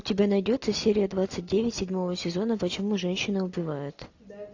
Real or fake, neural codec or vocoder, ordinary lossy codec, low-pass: real; none; AAC, 48 kbps; 7.2 kHz